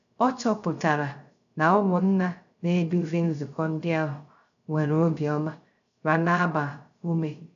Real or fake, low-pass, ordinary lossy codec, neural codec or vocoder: fake; 7.2 kHz; none; codec, 16 kHz, 0.3 kbps, FocalCodec